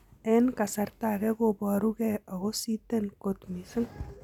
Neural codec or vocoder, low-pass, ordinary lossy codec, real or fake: none; 19.8 kHz; none; real